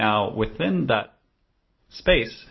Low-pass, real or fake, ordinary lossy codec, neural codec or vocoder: 7.2 kHz; real; MP3, 24 kbps; none